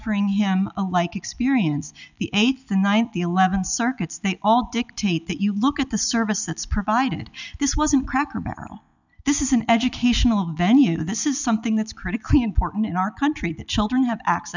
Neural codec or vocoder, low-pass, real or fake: none; 7.2 kHz; real